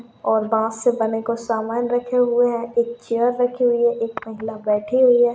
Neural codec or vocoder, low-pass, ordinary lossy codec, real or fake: none; none; none; real